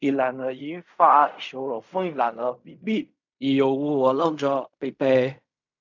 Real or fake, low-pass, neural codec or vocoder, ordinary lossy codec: fake; 7.2 kHz; codec, 16 kHz in and 24 kHz out, 0.4 kbps, LongCat-Audio-Codec, fine tuned four codebook decoder; none